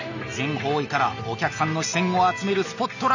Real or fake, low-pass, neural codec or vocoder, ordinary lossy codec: real; 7.2 kHz; none; none